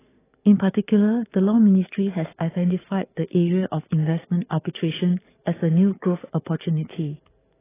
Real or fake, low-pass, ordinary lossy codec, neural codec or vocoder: fake; 3.6 kHz; AAC, 16 kbps; codec, 44.1 kHz, 7.8 kbps, DAC